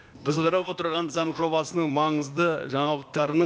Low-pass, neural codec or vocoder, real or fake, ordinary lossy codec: none; codec, 16 kHz, 0.8 kbps, ZipCodec; fake; none